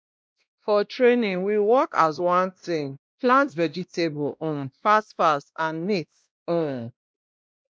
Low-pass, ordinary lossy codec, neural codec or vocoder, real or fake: none; none; codec, 16 kHz, 1 kbps, X-Codec, WavLM features, trained on Multilingual LibriSpeech; fake